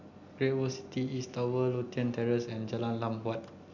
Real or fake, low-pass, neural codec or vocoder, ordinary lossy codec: real; 7.2 kHz; none; none